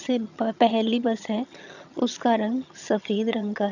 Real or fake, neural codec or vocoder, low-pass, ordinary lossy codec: fake; vocoder, 22.05 kHz, 80 mel bands, HiFi-GAN; 7.2 kHz; none